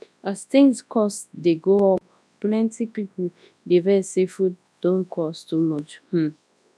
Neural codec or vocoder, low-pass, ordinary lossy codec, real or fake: codec, 24 kHz, 0.9 kbps, WavTokenizer, large speech release; none; none; fake